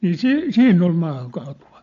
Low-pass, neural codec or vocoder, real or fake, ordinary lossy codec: 7.2 kHz; none; real; AAC, 64 kbps